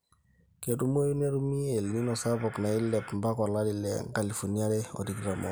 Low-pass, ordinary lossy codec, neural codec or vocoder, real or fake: none; none; none; real